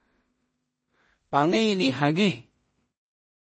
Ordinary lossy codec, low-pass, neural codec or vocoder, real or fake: MP3, 32 kbps; 9.9 kHz; codec, 16 kHz in and 24 kHz out, 0.4 kbps, LongCat-Audio-Codec, two codebook decoder; fake